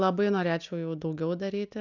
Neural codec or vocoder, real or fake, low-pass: none; real; 7.2 kHz